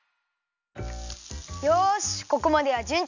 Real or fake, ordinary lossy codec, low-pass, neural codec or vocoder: real; none; 7.2 kHz; none